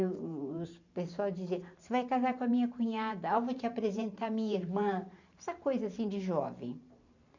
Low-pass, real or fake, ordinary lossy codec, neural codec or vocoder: 7.2 kHz; fake; none; vocoder, 44.1 kHz, 128 mel bands every 512 samples, BigVGAN v2